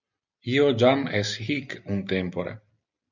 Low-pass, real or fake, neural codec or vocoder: 7.2 kHz; real; none